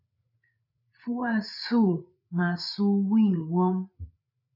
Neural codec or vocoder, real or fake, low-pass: codec, 16 kHz, 8 kbps, FreqCodec, larger model; fake; 5.4 kHz